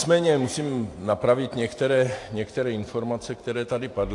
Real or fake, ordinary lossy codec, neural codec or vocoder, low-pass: fake; AAC, 48 kbps; vocoder, 44.1 kHz, 128 mel bands every 512 samples, BigVGAN v2; 10.8 kHz